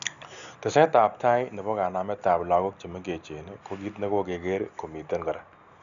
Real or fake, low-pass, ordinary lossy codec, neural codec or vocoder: real; 7.2 kHz; none; none